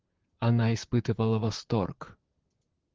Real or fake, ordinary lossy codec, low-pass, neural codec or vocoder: fake; Opus, 16 kbps; 7.2 kHz; vocoder, 44.1 kHz, 128 mel bands, Pupu-Vocoder